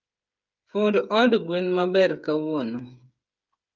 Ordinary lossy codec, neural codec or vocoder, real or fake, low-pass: Opus, 24 kbps; codec, 16 kHz, 8 kbps, FreqCodec, smaller model; fake; 7.2 kHz